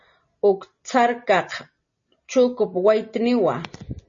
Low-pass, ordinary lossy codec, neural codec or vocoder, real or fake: 7.2 kHz; MP3, 32 kbps; none; real